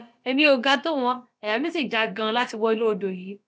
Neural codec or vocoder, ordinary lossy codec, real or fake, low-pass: codec, 16 kHz, about 1 kbps, DyCAST, with the encoder's durations; none; fake; none